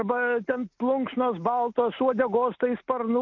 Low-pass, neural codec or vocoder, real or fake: 7.2 kHz; none; real